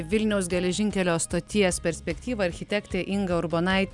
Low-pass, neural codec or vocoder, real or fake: 10.8 kHz; none; real